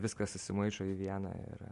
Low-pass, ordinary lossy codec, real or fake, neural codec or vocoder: 10.8 kHz; MP3, 64 kbps; real; none